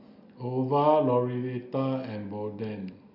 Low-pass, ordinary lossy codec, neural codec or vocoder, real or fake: 5.4 kHz; none; none; real